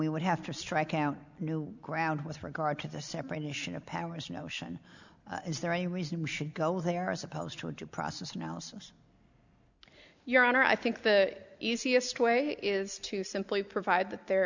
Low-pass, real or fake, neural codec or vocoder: 7.2 kHz; real; none